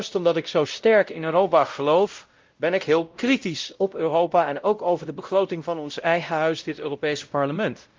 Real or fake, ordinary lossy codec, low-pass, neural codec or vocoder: fake; Opus, 32 kbps; 7.2 kHz; codec, 16 kHz, 0.5 kbps, X-Codec, WavLM features, trained on Multilingual LibriSpeech